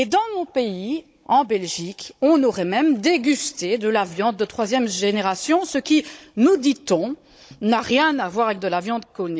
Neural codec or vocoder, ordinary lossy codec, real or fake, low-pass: codec, 16 kHz, 16 kbps, FunCodec, trained on Chinese and English, 50 frames a second; none; fake; none